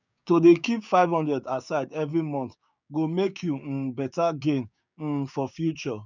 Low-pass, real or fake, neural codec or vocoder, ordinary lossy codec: 7.2 kHz; fake; codec, 16 kHz, 6 kbps, DAC; none